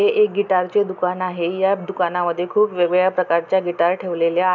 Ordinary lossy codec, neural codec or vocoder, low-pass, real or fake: none; none; 7.2 kHz; real